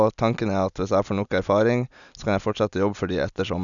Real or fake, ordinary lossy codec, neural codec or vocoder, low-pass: real; none; none; 7.2 kHz